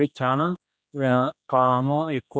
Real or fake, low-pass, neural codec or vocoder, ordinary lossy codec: fake; none; codec, 16 kHz, 1 kbps, X-Codec, HuBERT features, trained on general audio; none